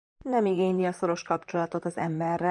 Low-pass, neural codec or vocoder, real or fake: 10.8 kHz; vocoder, 44.1 kHz, 128 mel bands, Pupu-Vocoder; fake